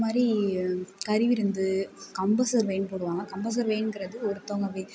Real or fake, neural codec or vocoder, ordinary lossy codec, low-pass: real; none; none; none